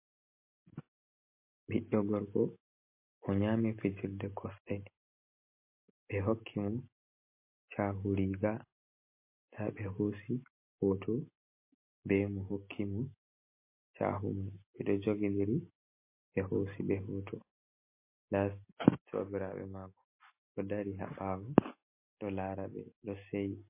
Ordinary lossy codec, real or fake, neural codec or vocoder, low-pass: MP3, 24 kbps; real; none; 3.6 kHz